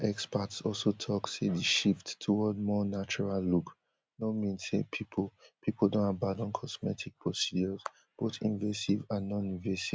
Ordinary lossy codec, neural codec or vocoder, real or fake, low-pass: none; none; real; none